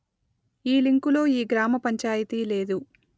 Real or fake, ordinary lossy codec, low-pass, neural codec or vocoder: real; none; none; none